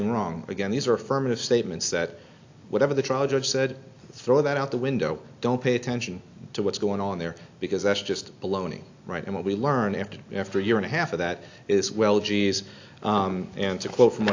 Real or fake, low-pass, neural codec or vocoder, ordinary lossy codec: real; 7.2 kHz; none; AAC, 48 kbps